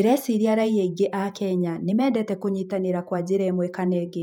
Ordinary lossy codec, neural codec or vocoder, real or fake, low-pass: none; vocoder, 48 kHz, 128 mel bands, Vocos; fake; 19.8 kHz